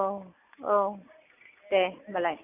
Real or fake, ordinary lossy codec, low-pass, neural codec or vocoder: real; none; 3.6 kHz; none